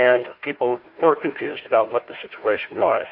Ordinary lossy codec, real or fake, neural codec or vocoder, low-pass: MP3, 32 kbps; fake; codec, 16 kHz, 1 kbps, FunCodec, trained on Chinese and English, 50 frames a second; 5.4 kHz